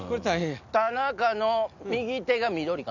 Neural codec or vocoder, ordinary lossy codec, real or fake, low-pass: none; none; real; 7.2 kHz